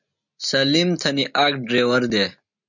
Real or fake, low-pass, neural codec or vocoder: real; 7.2 kHz; none